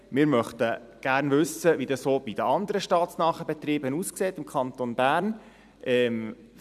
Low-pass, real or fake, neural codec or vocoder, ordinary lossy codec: 14.4 kHz; fake; vocoder, 44.1 kHz, 128 mel bands every 256 samples, BigVGAN v2; none